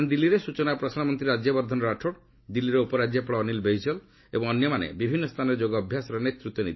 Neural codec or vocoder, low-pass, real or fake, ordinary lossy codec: none; 7.2 kHz; real; MP3, 24 kbps